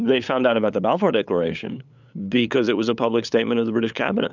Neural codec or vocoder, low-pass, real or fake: codec, 16 kHz, 8 kbps, FunCodec, trained on LibriTTS, 25 frames a second; 7.2 kHz; fake